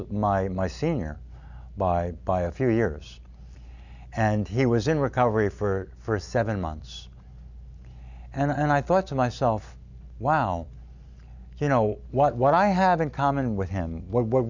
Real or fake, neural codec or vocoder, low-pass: real; none; 7.2 kHz